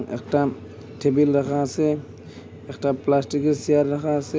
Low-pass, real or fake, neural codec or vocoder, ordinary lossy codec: none; real; none; none